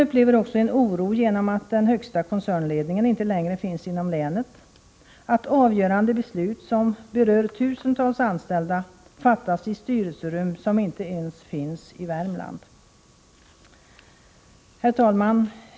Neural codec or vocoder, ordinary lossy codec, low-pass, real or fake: none; none; none; real